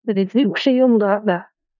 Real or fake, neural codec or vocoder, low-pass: fake; codec, 16 kHz in and 24 kHz out, 0.4 kbps, LongCat-Audio-Codec, four codebook decoder; 7.2 kHz